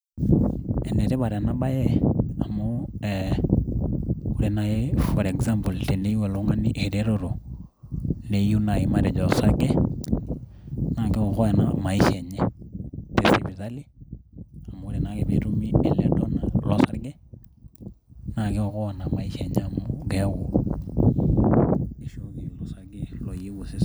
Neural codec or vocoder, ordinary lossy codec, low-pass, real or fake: none; none; none; real